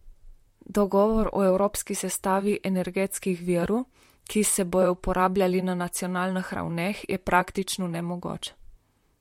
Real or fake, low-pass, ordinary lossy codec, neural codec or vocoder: fake; 19.8 kHz; MP3, 64 kbps; vocoder, 44.1 kHz, 128 mel bands, Pupu-Vocoder